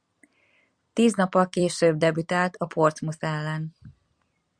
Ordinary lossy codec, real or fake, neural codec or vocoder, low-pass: Opus, 64 kbps; fake; vocoder, 44.1 kHz, 128 mel bands every 256 samples, BigVGAN v2; 9.9 kHz